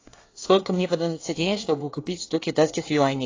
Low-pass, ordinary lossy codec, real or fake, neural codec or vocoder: 7.2 kHz; AAC, 32 kbps; fake; codec, 24 kHz, 1 kbps, SNAC